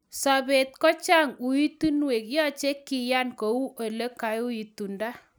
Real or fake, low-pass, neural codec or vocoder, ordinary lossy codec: real; none; none; none